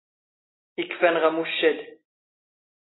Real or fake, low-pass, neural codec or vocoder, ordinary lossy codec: real; 7.2 kHz; none; AAC, 16 kbps